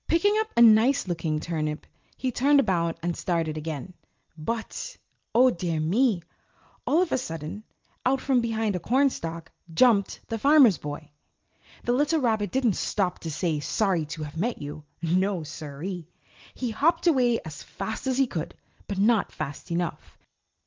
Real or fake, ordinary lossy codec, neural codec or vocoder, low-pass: real; Opus, 32 kbps; none; 7.2 kHz